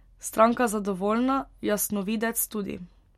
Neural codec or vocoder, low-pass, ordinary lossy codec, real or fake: none; 19.8 kHz; MP3, 64 kbps; real